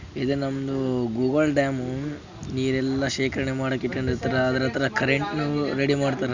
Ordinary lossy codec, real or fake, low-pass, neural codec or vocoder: none; real; 7.2 kHz; none